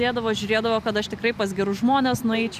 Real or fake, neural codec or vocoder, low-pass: real; none; 14.4 kHz